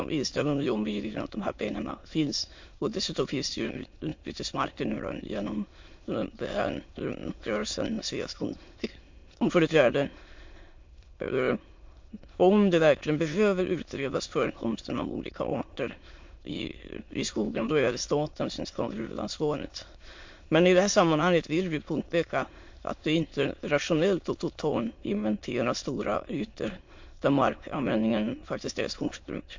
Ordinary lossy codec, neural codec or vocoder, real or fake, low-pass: MP3, 48 kbps; autoencoder, 22.05 kHz, a latent of 192 numbers a frame, VITS, trained on many speakers; fake; 7.2 kHz